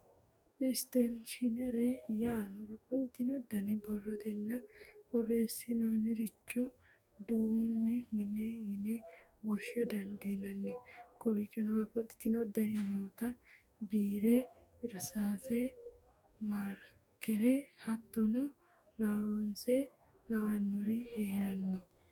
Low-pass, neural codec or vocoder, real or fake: 19.8 kHz; codec, 44.1 kHz, 2.6 kbps, DAC; fake